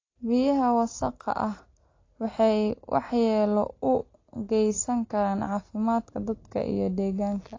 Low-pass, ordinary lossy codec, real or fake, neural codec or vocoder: 7.2 kHz; AAC, 32 kbps; real; none